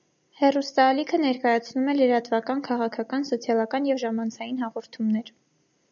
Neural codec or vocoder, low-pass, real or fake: none; 7.2 kHz; real